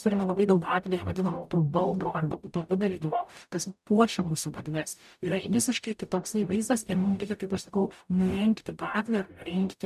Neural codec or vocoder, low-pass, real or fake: codec, 44.1 kHz, 0.9 kbps, DAC; 14.4 kHz; fake